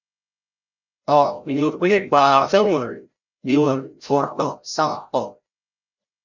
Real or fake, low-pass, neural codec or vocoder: fake; 7.2 kHz; codec, 16 kHz, 0.5 kbps, FreqCodec, larger model